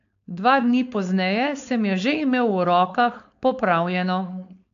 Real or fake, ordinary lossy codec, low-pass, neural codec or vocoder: fake; AAC, 64 kbps; 7.2 kHz; codec, 16 kHz, 4.8 kbps, FACodec